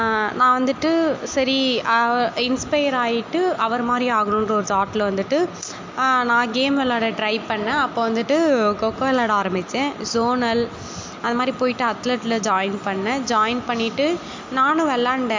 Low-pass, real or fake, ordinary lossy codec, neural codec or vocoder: 7.2 kHz; real; MP3, 48 kbps; none